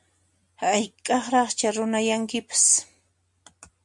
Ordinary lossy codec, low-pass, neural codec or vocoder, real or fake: MP3, 64 kbps; 10.8 kHz; none; real